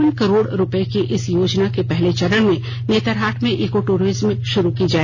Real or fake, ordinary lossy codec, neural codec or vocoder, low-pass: real; none; none; none